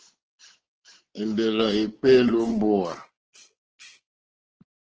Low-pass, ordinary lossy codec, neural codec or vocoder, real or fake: 7.2 kHz; Opus, 16 kbps; codec, 16 kHz, 4 kbps, X-Codec, WavLM features, trained on Multilingual LibriSpeech; fake